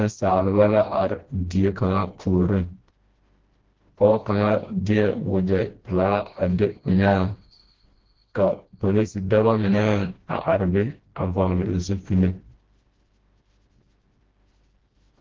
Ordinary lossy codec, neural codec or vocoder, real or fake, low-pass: Opus, 16 kbps; codec, 16 kHz, 1 kbps, FreqCodec, smaller model; fake; 7.2 kHz